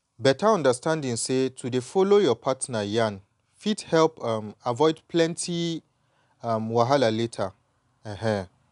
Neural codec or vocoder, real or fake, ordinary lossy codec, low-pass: none; real; none; 10.8 kHz